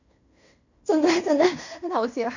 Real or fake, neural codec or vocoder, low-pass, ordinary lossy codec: fake; codec, 16 kHz in and 24 kHz out, 0.9 kbps, LongCat-Audio-Codec, fine tuned four codebook decoder; 7.2 kHz; none